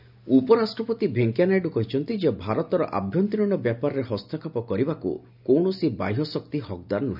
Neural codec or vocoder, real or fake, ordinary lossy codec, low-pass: none; real; none; 5.4 kHz